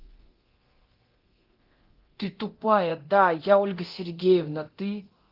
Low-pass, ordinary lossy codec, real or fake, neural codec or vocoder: 5.4 kHz; Opus, 16 kbps; fake; codec, 24 kHz, 0.9 kbps, DualCodec